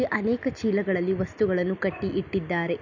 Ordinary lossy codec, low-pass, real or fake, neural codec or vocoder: none; 7.2 kHz; real; none